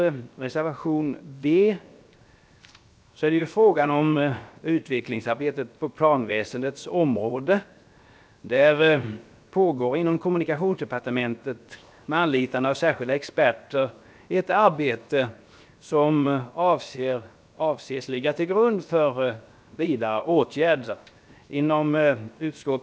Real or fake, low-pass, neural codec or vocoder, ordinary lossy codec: fake; none; codec, 16 kHz, 0.7 kbps, FocalCodec; none